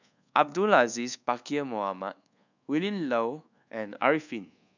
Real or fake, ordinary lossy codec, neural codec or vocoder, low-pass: fake; none; codec, 24 kHz, 1.2 kbps, DualCodec; 7.2 kHz